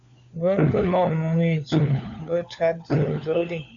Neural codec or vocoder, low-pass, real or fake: codec, 16 kHz, 4 kbps, FunCodec, trained on LibriTTS, 50 frames a second; 7.2 kHz; fake